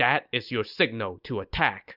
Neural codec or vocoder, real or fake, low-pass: none; real; 5.4 kHz